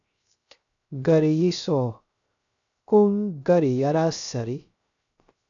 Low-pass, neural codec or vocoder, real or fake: 7.2 kHz; codec, 16 kHz, 0.3 kbps, FocalCodec; fake